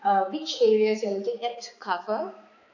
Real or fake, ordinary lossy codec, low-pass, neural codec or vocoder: fake; none; 7.2 kHz; codec, 16 kHz, 4 kbps, X-Codec, HuBERT features, trained on balanced general audio